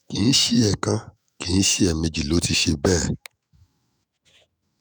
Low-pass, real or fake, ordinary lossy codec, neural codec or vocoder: none; fake; none; autoencoder, 48 kHz, 128 numbers a frame, DAC-VAE, trained on Japanese speech